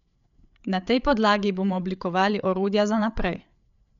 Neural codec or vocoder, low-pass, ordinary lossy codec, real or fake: codec, 16 kHz, 8 kbps, FreqCodec, larger model; 7.2 kHz; none; fake